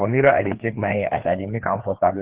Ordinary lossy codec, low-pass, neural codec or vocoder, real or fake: Opus, 16 kbps; 3.6 kHz; codec, 16 kHz, 2 kbps, FreqCodec, larger model; fake